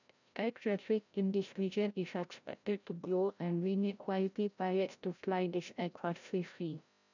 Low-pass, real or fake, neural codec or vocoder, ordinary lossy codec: 7.2 kHz; fake; codec, 16 kHz, 0.5 kbps, FreqCodec, larger model; none